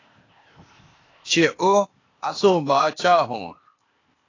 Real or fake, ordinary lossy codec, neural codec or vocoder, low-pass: fake; AAC, 32 kbps; codec, 16 kHz, 0.8 kbps, ZipCodec; 7.2 kHz